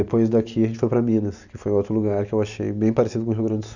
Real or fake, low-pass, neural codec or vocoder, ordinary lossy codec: real; 7.2 kHz; none; none